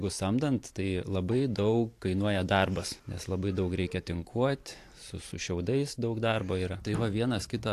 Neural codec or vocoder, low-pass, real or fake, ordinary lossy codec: vocoder, 44.1 kHz, 128 mel bands every 512 samples, BigVGAN v2; 14.4 kHz; fake; AAC, 64 kbps